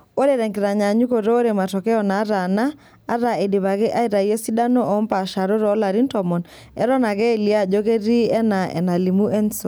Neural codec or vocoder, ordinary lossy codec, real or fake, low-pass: none; none; real; none